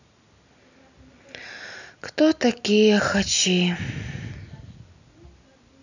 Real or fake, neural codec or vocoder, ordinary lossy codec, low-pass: real; none; none; 7.2 kHz